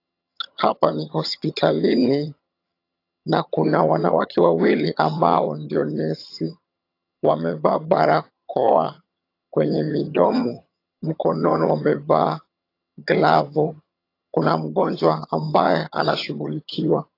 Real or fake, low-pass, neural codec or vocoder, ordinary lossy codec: fake; 5.4 kHz; vocoder, 22.05 kHz, 80 mel bands, HiFi-GAN; AAC, 32 kbps